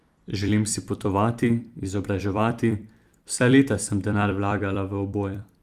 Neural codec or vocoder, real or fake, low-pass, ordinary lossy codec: vocoder, 44.1 kHz, 128 mel bands every 256 samples, BigVGAN v2; fake; 14.4 kHz; Opus, 32 kbps